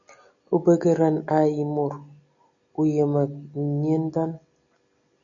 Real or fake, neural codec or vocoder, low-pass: real; none; 7.2 kHz